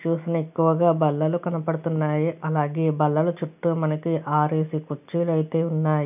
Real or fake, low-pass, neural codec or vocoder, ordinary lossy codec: real; 3.6 kHz; none; none